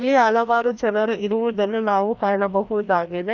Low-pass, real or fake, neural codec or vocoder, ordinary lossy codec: 7.2 kHz; fake; codec, 16 kHz, 1 kbps, FreqCodec, larger model; none